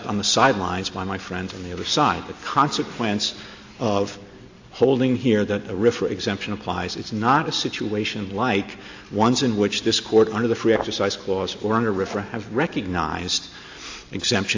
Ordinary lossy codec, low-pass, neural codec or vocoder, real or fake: AAC, 48 kbps; 7.2 kHz; none; real